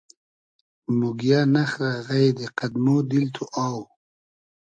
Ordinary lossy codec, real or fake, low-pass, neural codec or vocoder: AAC, 64 kbps; real; 9.9 kHz; none